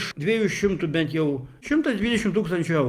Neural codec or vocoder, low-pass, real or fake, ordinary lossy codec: none; 14.4 kHz; real; Opus, 32 kbps